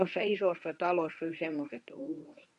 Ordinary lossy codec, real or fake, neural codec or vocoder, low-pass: none; fake; codec, 24 kHz, 0.9 kbps, WavTokenizer, medium speech release version 1; 10.8 kHz